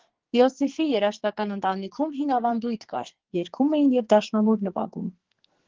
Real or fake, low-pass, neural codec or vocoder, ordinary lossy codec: fake; 7.2 kHz; codec, 44.1 kHz, 2.6 kbps, SNAC; Opus, 16 kbps